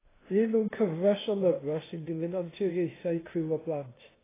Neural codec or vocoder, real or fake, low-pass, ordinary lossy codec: codec, 16 kHz, 0.8 kbps, ZipCodec; fake; 3.6 kHz; AAC, 16 kbps